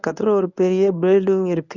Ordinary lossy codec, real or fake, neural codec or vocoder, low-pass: none; fake; codec, 24 kHz, 0.9 kbps, WavTokenizer, medium speech release version 1; 7.2 kHz